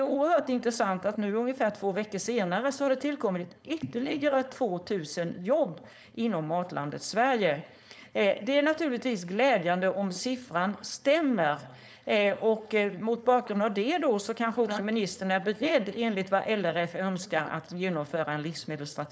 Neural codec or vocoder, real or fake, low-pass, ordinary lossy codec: codec, 16 kHz, 4.8 kbps, FACodec; fake; none; none